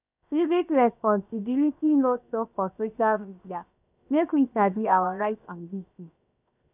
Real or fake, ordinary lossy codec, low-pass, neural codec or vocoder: fake; none; 3.6 kHz; codec, 16 kHz, 0.7 kbps, FocalCodec